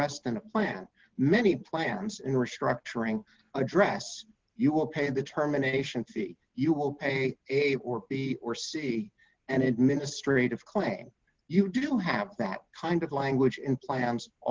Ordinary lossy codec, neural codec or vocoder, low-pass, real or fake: Opus, 16 kbps; none; 7.2 kHz; real